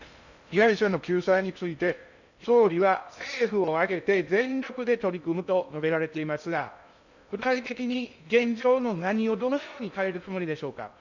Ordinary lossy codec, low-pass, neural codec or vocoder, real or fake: none; 7.2 kHz; codec, 16 kHz in and 24 kHz out, 0.6 kbps, FocalCodec, streaming, 2048 codes; fake